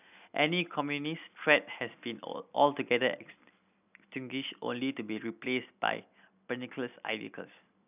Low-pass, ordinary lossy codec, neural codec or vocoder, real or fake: 3.6 kHz; none; none; real